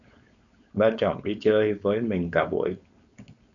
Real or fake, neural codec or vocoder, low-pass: fake; codec, 16 kHz, 4.8 kbps, FACodec; 7.2 kHz